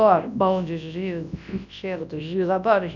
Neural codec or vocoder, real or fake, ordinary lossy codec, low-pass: codec, 24 kHz, 0.9 kbps, WavTokenizer, large speech release; fake; none; 7.2 kHz